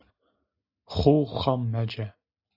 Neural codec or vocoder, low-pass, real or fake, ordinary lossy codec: none; 5.4 kHz; real; AAC, 48 kbps